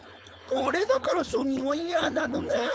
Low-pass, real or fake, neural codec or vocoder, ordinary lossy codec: none; fake; codec, 16 kHz, 4.8 kbps, FACodec; none